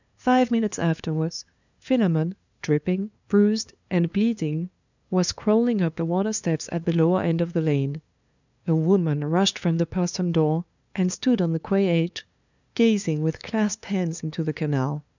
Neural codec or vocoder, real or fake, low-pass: codec, 16 kHz, 2 kbps, FunCodec, trained on LibriTTS, 25 frames a second; fake; 7.2 kHz